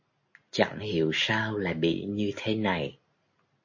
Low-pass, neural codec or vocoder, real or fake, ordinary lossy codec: 7.2 kHz; none; real; MP3, 32 kbps